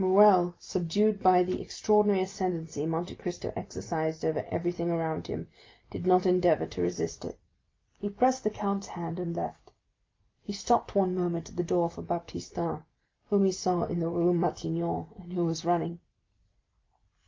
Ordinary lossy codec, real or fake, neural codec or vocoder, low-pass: Opus, 32 kbps; real; none; 7.2 kHz